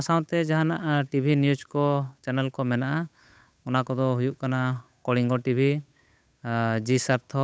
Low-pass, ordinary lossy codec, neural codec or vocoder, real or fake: none; none; codec, 16 kHz, 8 kbps, FunCodec, trained on Chinese and English, 25 frames a second; fake